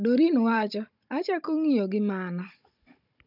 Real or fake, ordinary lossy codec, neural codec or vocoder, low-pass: fake; none; codec, 16 kHz, 16 kbps, FunCodec, trained on Chinese and English, 50 frames a second; 5.4 kHz